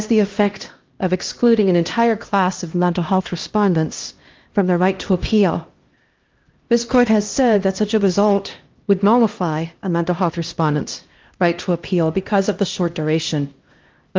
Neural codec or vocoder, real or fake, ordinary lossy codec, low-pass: codec, 16 kHz, 1 kbps, X-Codec, HuBERT features, trained on LibriSpeech; fake; Opus, 32 kbps; 7.2 kHz